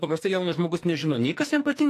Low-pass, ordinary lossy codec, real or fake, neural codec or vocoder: 14.4 kHz; AAC, 64 kbps; fake; codec, 44.1 kHz, 2.6 kbps, SNAC